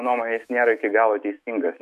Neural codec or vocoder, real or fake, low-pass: autoencoder, 48 kHz, 128 numbers a frame, DAC-VAE, trained on Japanese speech; fake; 14.4 kHz